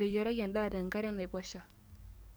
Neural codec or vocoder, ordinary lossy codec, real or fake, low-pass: codec, 44.1 kHz, 7.8 kbps, DAC; none; fake; none